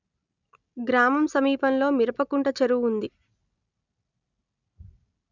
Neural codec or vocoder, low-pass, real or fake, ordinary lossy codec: none; 7.2 kHz; real; none